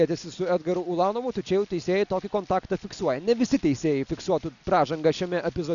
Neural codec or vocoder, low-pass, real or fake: none; 7.2 kHz; real